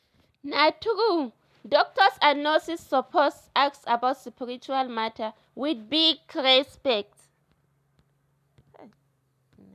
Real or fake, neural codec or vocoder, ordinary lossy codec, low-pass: real; none; none; 14.4 kHz